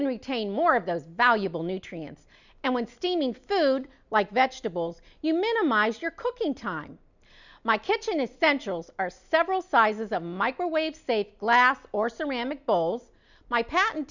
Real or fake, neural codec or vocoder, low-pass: real; none; 7.2 kHz